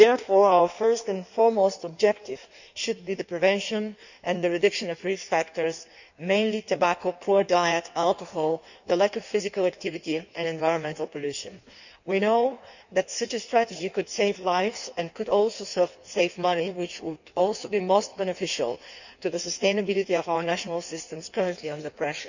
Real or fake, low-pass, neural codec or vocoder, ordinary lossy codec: fake; 7.2 kHz; codec, 16 kHz in and 24 kHz out, 1.1 kbps, FireRedTTS-2 codec; none